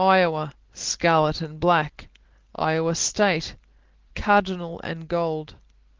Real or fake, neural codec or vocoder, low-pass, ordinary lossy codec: real; none; 7.2 kHz; Opus, 16 kbps